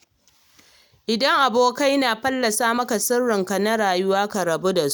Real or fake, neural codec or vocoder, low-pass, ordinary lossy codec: real; none; none; none